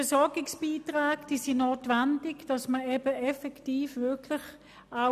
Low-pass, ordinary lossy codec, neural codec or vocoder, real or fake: 14.4 kHz; none; none; real